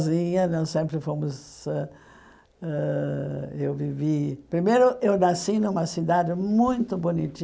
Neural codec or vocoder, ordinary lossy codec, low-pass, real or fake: none; none; none; real